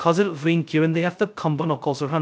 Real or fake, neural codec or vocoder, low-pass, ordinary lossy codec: fake; codec, 16 kHz, 0.2 kbps, FocalCodec; none; none